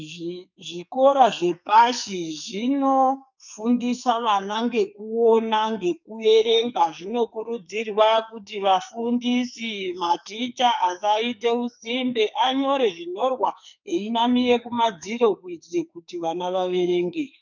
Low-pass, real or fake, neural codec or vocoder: 7.2 kHz; fake; codec, 44.1 kHz, 2.6 kbps, SNAC